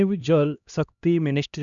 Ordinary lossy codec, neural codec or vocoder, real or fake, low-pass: none; codec, 16 kHz, 1 kbps, X-Codec, HuBERT features, trained on LibriSpeech; fake; 7.2 kHz